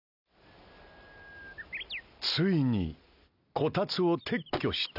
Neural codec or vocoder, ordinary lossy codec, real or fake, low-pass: none; none; real; 5.4 kHz